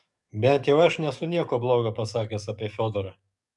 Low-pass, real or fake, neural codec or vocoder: 10.8 kHz; fake; codec, 44.1 kHz, 7.8 kbps, DAC